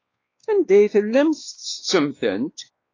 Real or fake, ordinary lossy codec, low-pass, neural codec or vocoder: fake; AAC, 32 kbps; 7.2 kHz; codec, 16 kHz, 2 kbps, X-Codec, WavLM features, trained on Multilingual LibriSpeech